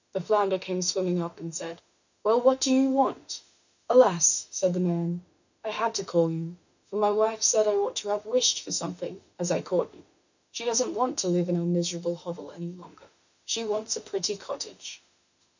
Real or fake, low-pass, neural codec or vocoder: fake; 7.2 kHz; autoencoder, 48 kHz, 32 numbers a frame, DAC-VAE, trained on Japanese speech